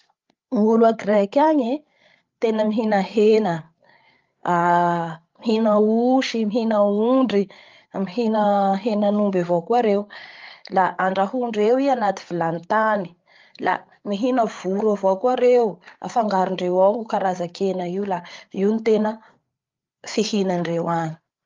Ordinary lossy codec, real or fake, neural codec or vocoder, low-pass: Opus, 32 kbps; fake; codec, 16 kHz, 8 kbps, FreqCodec, larger model; 7.2 kHz